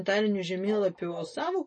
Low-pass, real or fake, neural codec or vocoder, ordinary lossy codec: 10.8 kHz; real; none; MP3, 32 kbps